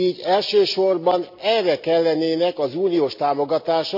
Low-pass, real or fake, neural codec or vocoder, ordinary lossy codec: 5.4 kHz; real; none; none